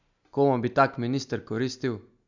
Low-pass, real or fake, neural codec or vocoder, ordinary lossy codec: 7.2 kHz; real; none; none